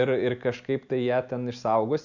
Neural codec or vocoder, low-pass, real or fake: none; 7.2 kHz; real